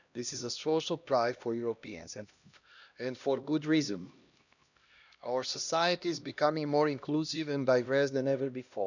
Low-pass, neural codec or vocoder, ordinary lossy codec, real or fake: 7.2 kHz; codec, 16 kHz, 1 kbps, X-Codec, HuBERT features, trained on LibriSpeech; none; fake